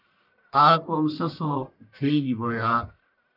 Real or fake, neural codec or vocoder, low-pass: fake; codec, 44.1 kHz, 1.7 kbps, Pupu-Codec; 5.4 kHz